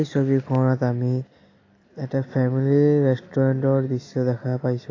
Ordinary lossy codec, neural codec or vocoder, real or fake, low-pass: AAC, 32 kbps; none; real; 7.2 kHz